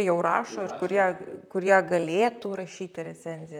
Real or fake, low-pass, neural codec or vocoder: fake; 19.8 kHz; vocoder, 44.1 kHz, 128 mel bands, Pupu-Vocoder